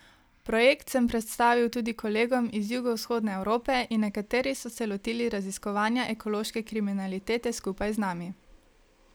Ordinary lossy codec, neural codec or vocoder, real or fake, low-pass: none; none; real; none